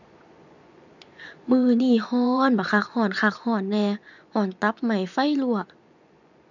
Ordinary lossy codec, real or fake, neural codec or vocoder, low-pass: none; fake; vocoder, 24 kHz, 100 mel bands, Vocos; 7.2 kHz